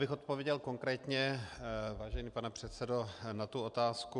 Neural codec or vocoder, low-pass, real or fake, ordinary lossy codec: none; 10.8 kHz; real; MP3, 96 kbps